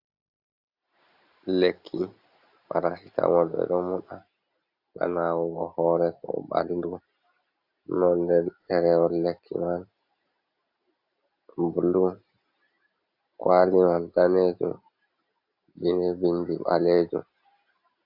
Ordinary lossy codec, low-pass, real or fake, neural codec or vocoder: AAC, 48 kbps; 5.4 kHz; real; none